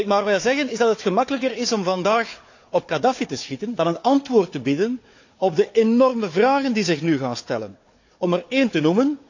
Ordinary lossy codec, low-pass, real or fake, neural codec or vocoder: AAC, 48 kbps; 7.2 kHz; fake; codec, 16 kHz, 4 kbps, FunCodec, trained on Chinese and English, 50 frames a second